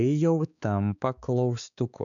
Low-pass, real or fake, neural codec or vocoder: 7.2 kHz; fake; codec, 16 kHz, 4 kbps, X-Codec, HuBERT features, trained on balanced general audio